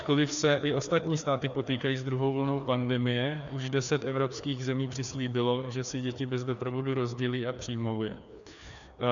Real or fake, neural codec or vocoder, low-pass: fake; codec, 16 kHz, 2 kbps, FreqCodec, larger model; 7.2 kHz